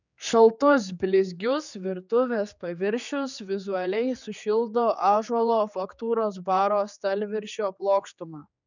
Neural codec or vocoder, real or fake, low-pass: codec, 16 kHz, 4 kbps, X-Codec, HuBERT features, trained on general audio; fake; 7.2 kHz